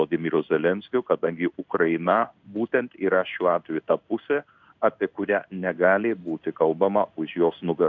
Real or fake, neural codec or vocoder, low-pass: fake; codec, 16 kHz in and 24 kHz out, 1 kbps, XY-Tokenizer; 7.2 kHz